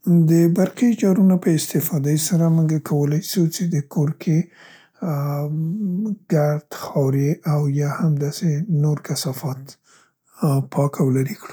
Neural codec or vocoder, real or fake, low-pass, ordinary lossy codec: none; real; none; none